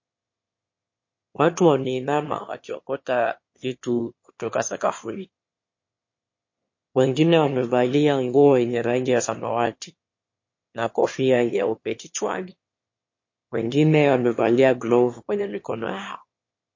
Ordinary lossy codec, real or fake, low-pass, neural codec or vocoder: MP3, 32 kbps; fake; 7.2 kHz; autoencoder, 22.05 kHz, a latent of 192 numbers a frame, VITS, trained on one speaker